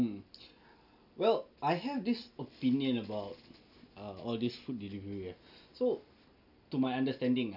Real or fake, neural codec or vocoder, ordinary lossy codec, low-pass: real; none; none; 5.4 kHz